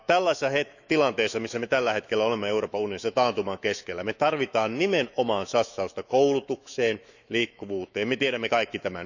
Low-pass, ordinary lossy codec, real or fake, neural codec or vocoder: 7.2 kHz; none; fake; autoencoder, 48 kHz, 128 numbers a frame, DAC-VAE, trained on Japanese speech